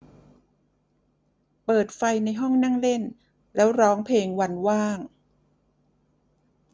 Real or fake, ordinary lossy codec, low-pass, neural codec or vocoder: real; none; none; none